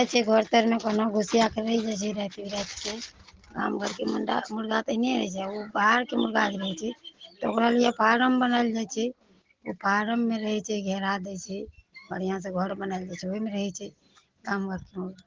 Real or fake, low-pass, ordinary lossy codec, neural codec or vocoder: real; 7.2 kHz; Opus, 16 kbps; none